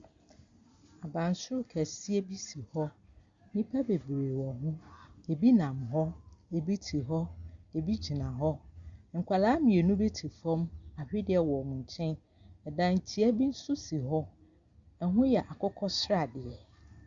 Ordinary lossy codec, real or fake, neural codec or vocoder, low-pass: Opus, 64 kbps; real; none; 7.2 kHz